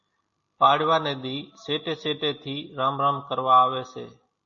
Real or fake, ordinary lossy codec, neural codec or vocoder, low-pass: real; MP3, 32 kbps; none; 7.2 kHz